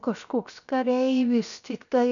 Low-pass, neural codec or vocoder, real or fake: 7.2 kHz; codec, 16 kHz, 0.7 kbps, FocalCodec; fake